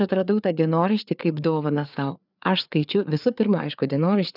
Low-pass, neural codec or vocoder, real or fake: 5.4 kHz; codec, 16 kHz, 4 kbps, FreqCodec, larger model; fake